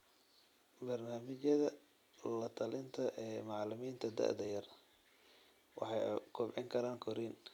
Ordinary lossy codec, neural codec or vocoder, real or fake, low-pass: none; vocoder, 44.1 kHz, 128 mel bands every 256 samples, BigVGAN v2; fake; 19.8 kHz